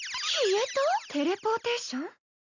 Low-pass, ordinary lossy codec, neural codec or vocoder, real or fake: 7.2 kHz; none; none; real